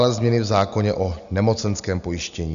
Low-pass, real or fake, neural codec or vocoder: 7.2 kHz; real; none